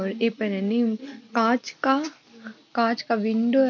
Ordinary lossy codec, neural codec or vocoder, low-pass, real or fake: MP3, 48 kbps; none; 7.2 kHz; real